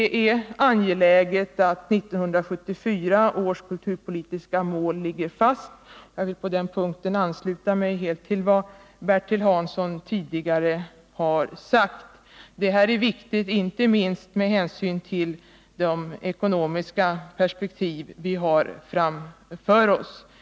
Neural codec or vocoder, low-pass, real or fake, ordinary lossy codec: none; none; real; none